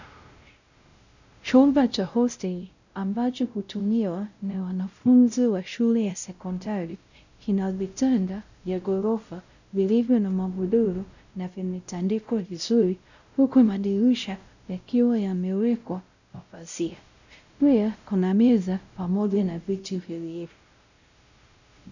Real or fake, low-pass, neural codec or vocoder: fake; 7.2 kHz; codec, 16 kHz, 0.5 kbps, X-Codec, WavLM features, trained on Multilingual LibriSpeech